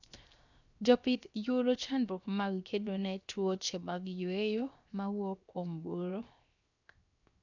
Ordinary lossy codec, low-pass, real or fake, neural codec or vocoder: none; 7.2 kHz; fake; codec, 16 kHz, 0.7 kbps, FocalCodec